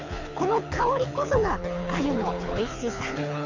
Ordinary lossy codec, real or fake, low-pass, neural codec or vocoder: Opus, 64 kbps; fake; 7.2 kHz; codec, 24 kHz, 6 kbps, HILCodec